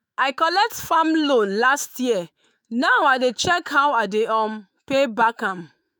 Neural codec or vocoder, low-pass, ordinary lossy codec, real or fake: autoencoder, 48 kHz, 128 numbers a frame, DAC-VAE, trained on Japanese speech; none; none; fake